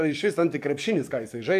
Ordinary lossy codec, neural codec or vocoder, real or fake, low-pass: MP3, 96 kbps; codec, 44.1 kHz, 7.8 kbps, DAC; fake; 14.4 kHz